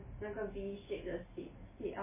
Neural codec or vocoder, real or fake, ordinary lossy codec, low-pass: none; real; MP3, 16 kbps; 3.6 kHz